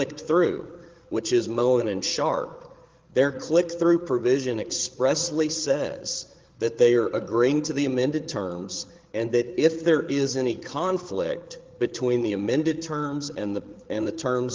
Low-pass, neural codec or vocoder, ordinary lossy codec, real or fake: 7.2 kHz; codec, 16 kHz, 8 kbps, FreqCodec, larger model; Opus, 16 kbps; fake